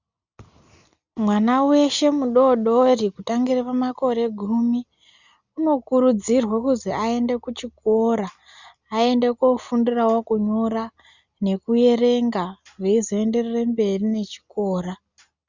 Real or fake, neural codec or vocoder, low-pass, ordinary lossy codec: real; none; 7.2 kHz; Opus, 64 kbps